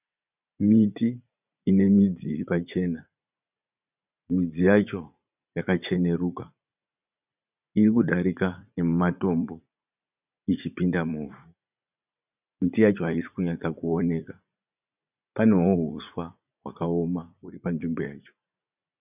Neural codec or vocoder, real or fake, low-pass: vocoder, 44.1 kHz, 80 mel bands, Vocos; fake; 3.6 kHz